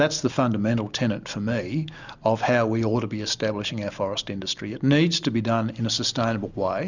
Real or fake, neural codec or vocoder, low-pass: real; none; 7.2 kHz